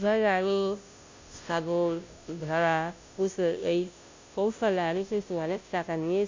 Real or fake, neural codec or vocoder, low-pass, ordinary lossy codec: fake; codec, 16 kHz, 0.5 kbps, FunCodec, trained on Chinese and English, 25 frames a second; 7.2 kHz; MP3, 64 kbps